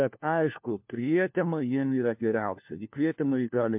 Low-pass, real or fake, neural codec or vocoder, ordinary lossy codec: 3.6 kHz; fake; codec, 16 kHz, 1 kbps, FunCodec, trained on LibriTTS, 50 frames a second; MP3, 32 kbps